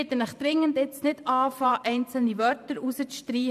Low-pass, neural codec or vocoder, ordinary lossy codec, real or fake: 14.4 kHz; vocoder, 44.1 kHz, 128 mel bands every 512 samples, BigVGAN v2; none; fake